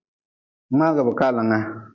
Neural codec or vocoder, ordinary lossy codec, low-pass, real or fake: none; MP3, 64 kbps; 7.2 kHz; real